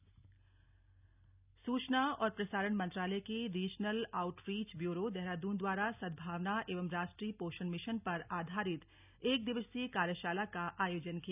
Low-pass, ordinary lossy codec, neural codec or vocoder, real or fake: 3.6 kHz; none; none; real